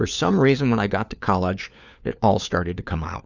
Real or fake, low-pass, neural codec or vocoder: fake; 7.2 kHz; codec, 16 kHz, 4 kbps, FunCodec, trained on LibriTTS, 50 frames a second